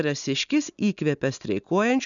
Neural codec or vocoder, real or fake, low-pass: none; real; 7.2 kHz